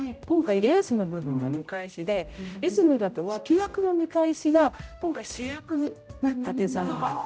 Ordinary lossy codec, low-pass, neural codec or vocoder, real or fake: none; none; codec, 16 kHz, 0.5 kbps, X-Codec, HuBERT features, trained on general audio; fake